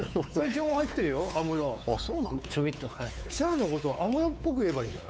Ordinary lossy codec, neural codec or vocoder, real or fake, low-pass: none; codec, 16 kHz, 4 kbps, X-Codec, WavLM features, trained on Multilingual LibriSpeech; fake; none